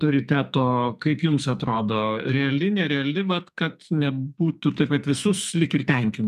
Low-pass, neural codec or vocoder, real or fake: 14.4 kHz; codec, 44.1 kHz, 2.6 kbps, SNAC; fake